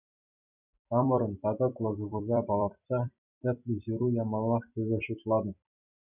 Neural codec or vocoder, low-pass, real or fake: vocoder, 44.1 kHz, 128 mel bands every 256 samples, BigVGAN v2; 3.6 kHz; fake